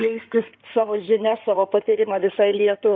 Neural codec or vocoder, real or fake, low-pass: codec, 16 kHz in and 24 kHz out, 2.2 kbps, FireRedTTS-2 codec; fake; 7.2 kHz